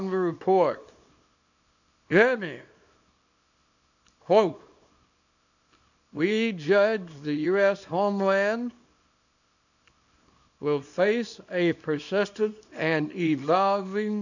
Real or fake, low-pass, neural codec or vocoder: fake; 7.2 kHz; codec, 24 kHz, 0.9 kbps, WavTokenizer, small release